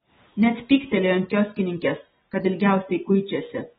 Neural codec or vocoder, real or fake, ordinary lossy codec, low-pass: none; real; AAC, 16 kbps; 19.8 kHz